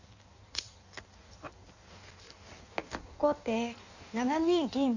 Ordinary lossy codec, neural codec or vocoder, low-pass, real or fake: none; codec, 16 kHz in and 24 kHz out, 1.1 kbps, FireRedTTS-2 codec; 7.2 kHz; fake